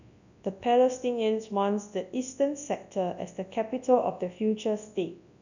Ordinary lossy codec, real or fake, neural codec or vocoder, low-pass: none; fake; codec, 24 kHz, 0.9 kbps, WavTokenizer, large speech release; 7.2 kHz